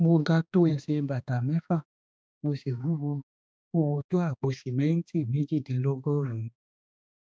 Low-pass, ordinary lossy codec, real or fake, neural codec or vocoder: none; none; fake; codec, 16 kHz, 2 kbps, X-Codec, HuBERT features, trained on balanced general audio